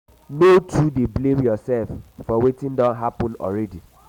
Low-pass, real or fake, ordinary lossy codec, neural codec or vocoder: 19.8 kHz; real; none; none